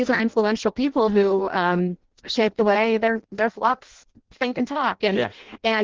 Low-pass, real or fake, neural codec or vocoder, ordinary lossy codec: 7.2 kHz; fake; codec, 16 kHz in and 24 kHz out, 0.6 kbps, FireRedTTS-2 codec; Opus, 16 kbps